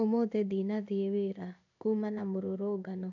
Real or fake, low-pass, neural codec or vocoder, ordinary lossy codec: fake; 7.2 kHz; codec, 16 kHz in and 24 kHz out, 1 kbps, XY-Tokenizer; none